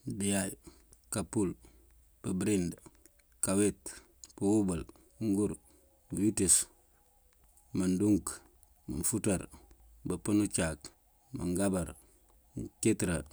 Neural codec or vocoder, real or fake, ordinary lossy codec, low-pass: none; real; none; none